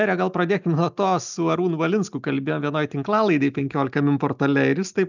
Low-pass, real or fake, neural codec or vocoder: 7.2 kHz; real; none